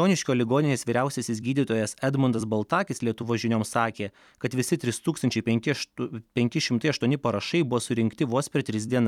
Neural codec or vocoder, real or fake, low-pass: vocoder, 44.1 kHz, 128 mel bands every 256 samples, BigVGAN v2; fake; 19.8 kHz